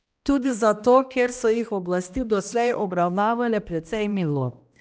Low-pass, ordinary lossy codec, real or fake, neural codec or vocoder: none; none; fake; codec, 16 kHz, 1 kbps, X-Codec, HuBERT features, trained on balanced general audio